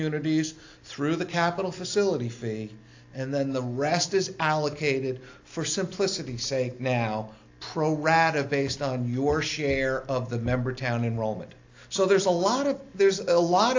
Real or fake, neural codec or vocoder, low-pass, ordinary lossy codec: real; none; 7.2 kHz; AAC, 48 kbps